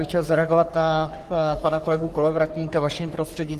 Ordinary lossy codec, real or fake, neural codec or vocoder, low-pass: Opus, 24 kbps; fake; codec, 44.1 kHz, 3.4 kbps, Pupu-Codec; 14.4 kHz